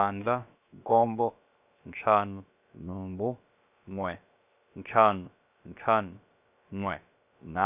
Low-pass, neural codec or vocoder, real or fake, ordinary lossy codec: 3.6 kHz; codec, 16 kHz, about 1 kbps, DyCAST, with the encoder's durations; fake; none